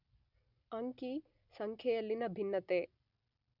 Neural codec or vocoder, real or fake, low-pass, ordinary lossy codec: none; real; 5.4 kHz; none